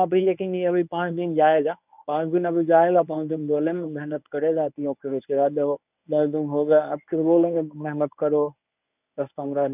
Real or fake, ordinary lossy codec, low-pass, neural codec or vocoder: fake; none; 3.6 kHz; codec, 24 kHz, 0.9 kbps, WavTokenizer, medium speech release version 1